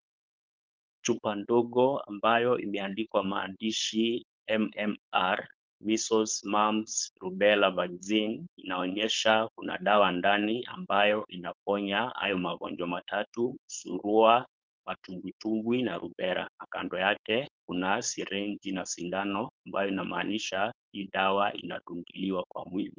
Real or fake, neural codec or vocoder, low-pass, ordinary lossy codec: fake; codec, 16 kHz, 4.8 kbps, FACodec; 7.2 kHz; Opus, 32 kbps